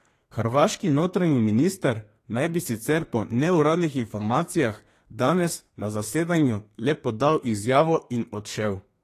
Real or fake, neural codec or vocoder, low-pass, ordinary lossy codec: fake; codec, 32 kHz, 1.9 kbps, SNAC; 14.4 kHz; AAC, 48 kbps